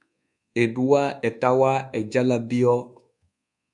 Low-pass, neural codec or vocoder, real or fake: 10.8 kHz; codec, 24 kHz, 1.2 kbps, DualCodec; fake